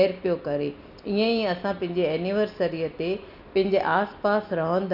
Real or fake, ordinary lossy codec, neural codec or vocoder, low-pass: real; none; none; 5.4 kHz